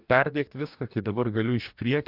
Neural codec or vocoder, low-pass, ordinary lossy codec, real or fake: codec, 44.1 kHz, 2.6 kbps, DAC; 5.4 kHz; MP3, 48 kbps; fake